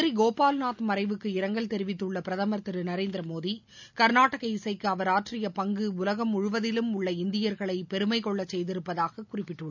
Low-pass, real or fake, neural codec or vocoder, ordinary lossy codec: 7.2 kHz; real; none; none